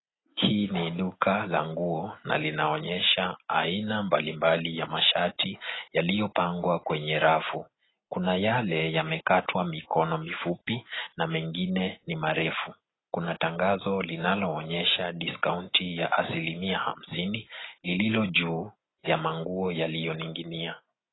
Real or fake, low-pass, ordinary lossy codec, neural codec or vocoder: real; 7.2 kHz; AAC, 16 kbps; none